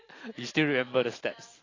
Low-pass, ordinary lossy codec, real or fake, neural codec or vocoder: 7.2 kHz; AAC, 32 kbps; real; none